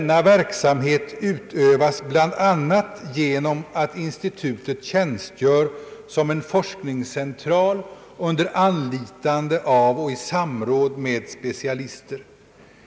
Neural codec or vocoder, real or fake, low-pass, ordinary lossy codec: none; real; none; none